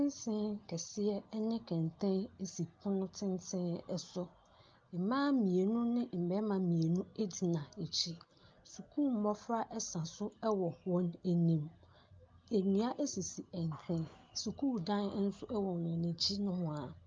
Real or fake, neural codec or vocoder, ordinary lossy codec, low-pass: real; none; Opus, 24 kbps; 7.2 kHz